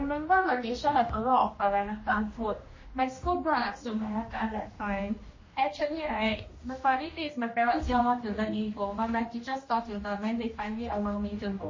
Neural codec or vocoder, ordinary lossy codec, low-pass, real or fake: codec, 16 kHz, 1 kbps, X-Codec, HuBERT features, trained on general audio; MP3, 32 kbps; 7.2 kHz; fake